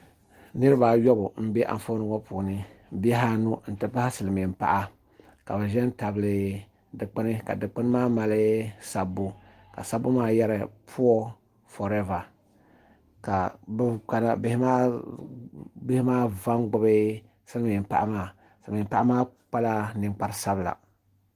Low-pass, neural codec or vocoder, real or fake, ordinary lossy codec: 14.4 kHz; none; real; Opus, 24 kbps